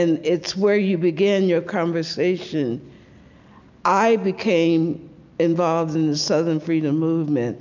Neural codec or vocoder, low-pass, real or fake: none; 7.2 kHz; real